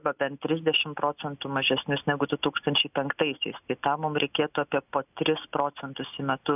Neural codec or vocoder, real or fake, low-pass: none; real; 3.6 kHz